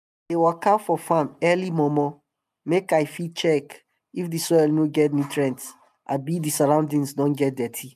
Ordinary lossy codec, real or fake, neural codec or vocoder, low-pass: none; real; none; 14.4 kHz